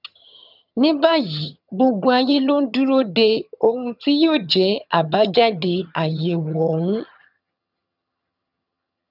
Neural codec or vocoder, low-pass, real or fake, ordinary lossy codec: vocoder, 22.05 kHz, 80 mel bands, HiFi-GAN; 5.4 kHz; fake; none